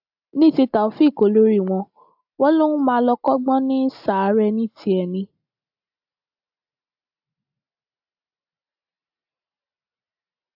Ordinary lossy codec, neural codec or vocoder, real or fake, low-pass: AAC, 48 kbps; none; real; 5.4 kHz